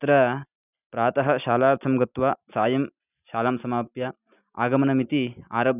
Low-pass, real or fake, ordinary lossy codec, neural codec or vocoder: 3.6 kHz; real; none; none